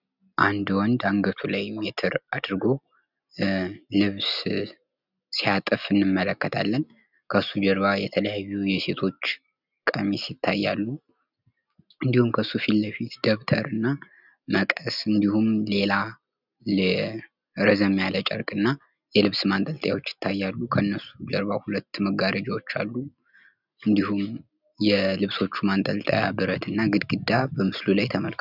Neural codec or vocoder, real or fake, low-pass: none; real; 5.4 kHz